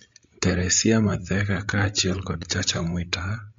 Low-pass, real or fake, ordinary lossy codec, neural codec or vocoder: 7.2 kHz; fake; MP3, 48 kbps; codec, 16 kHz, 16 kbps, FreqCodec, larger model